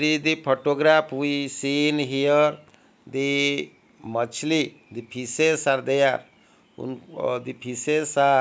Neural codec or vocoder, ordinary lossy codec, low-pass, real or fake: none; none; none; real